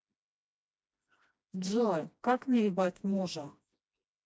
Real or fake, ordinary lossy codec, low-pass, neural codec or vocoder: fake; none; none; codec, 16 kHz, 1 kbps, FreqCodec, smaller model